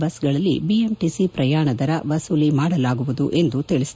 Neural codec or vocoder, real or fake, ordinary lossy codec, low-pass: none; real; none; none